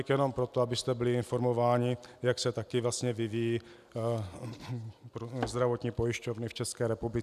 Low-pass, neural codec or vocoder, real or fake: 14.4 kHz; none; real